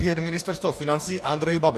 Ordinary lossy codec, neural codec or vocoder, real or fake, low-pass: AAC, 64 kbps; codec, 44.1 kHz, 2.6 kbps, DAC; fake; 14.4 kHz